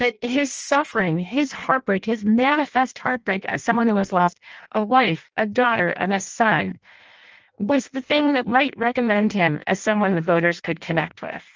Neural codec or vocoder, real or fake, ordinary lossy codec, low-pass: codec, 16 kHz in and 24 kHz out, 0.6 kbps, FireRedTTS-2 codec; fake; Opus, 24 kbps; 7.2 kHz